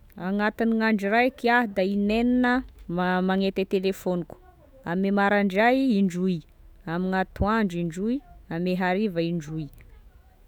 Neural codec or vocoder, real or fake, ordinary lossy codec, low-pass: autoencoder, 48 kHz, 128 numbers a frame, DAC-VAE, trained on Japanese speech; fake; none; none